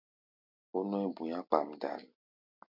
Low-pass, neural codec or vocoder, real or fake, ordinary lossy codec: 5.4 kHz; none; real; MP3, 48 kbps